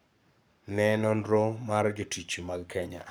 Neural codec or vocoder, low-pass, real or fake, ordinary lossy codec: codec, 44.1 kHz, 7.8 kbps, Pupu-Codec; none; fake; none